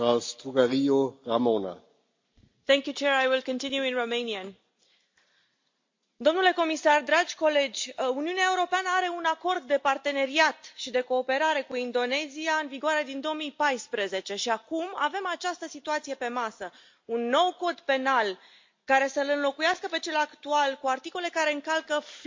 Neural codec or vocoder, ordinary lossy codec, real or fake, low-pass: none; MP3, 48 kbps; real; 7.2 kHz